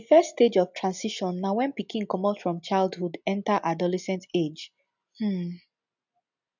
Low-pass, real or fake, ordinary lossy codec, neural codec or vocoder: 7.2 kHz; real; none; none